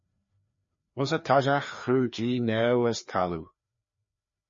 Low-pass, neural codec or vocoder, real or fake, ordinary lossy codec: 7.2 kHz; codec, 16 kHz, 2 kbps, FreqCodec, larger model; fake; MP3, 32 kbps